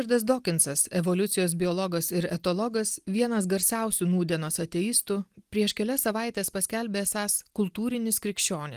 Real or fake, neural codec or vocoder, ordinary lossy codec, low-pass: real; none; Opus, 24 kbps; 14.4 kHz